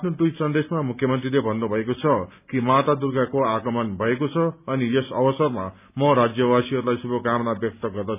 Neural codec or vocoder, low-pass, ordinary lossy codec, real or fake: none; 3.6 kHz; none; real